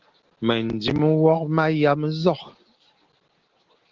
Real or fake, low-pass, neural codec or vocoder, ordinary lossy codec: real; 7.2 kHz; none; Opus, 16 kbps